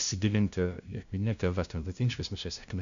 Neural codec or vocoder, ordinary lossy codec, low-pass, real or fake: codec, 16 kHz, 0.5 kbps, FunCodec, trained on LibriTTS, 25 frames a second; AAC, 96 kbps; 7.2 kHz; fake